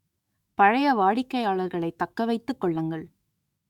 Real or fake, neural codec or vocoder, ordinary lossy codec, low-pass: fake; autoencoder, 48 kHz, 128 numbers a frame, DAC-VAE, trained on Japanese speech; Opus, 64 kbps; 19.8 kHz